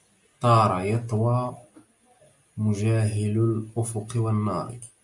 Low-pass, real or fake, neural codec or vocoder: 10.8 kHz; real; none